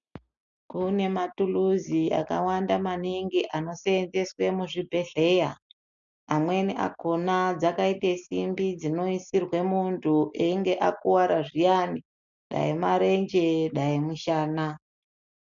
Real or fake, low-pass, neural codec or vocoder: real; 7.2 kHz; none